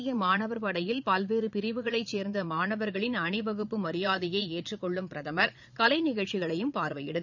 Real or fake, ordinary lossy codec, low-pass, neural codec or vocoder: fake; none; 7.2 kHz; vocoder, 22.05 kHz, 80 mel bands, Vocos